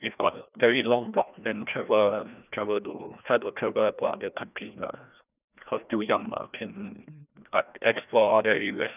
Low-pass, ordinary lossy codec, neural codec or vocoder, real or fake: 3.6 kHz; none; codec, 16 kHz, 1 kbps, FreqCodec, larger model; fake